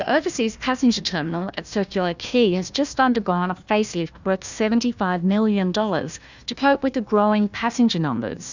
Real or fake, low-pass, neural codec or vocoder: fake; 7.2 kHz; codec, 16 kHz, 1 kbps, FunCodec, trained on Chinese and English, 50 frames a second